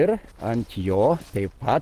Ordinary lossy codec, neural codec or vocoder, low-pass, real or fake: Opus, 16 kbps; none; 14.4 kHz; real